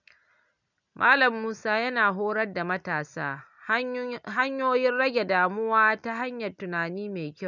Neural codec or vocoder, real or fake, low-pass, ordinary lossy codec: none; real; 7.2 kHz; none